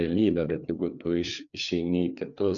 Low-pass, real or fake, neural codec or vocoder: 7.2 kHz; fake; codec, 16 kHz, 4 kbps, FreqCodec, larger model